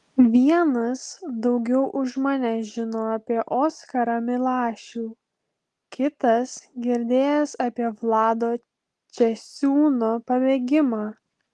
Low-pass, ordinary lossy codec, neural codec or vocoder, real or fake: 10.8 kHz; Opus, 24 kbps; none; real